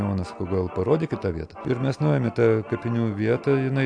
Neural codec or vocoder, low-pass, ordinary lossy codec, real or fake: none; 9.9 kHz; AAC, 64 kbps; real